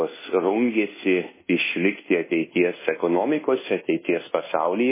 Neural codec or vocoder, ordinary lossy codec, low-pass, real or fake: codec, 24 kHz, 1.2 kbps, DualCodec; MP3, 16 kbps; 3.6 kHz; fake